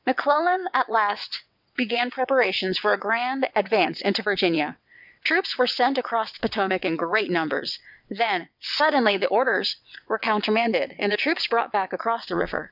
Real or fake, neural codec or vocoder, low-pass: fake; vocoder, 22.05 kHz, 80 mel bands, WaveNeXt; 5.4 kHz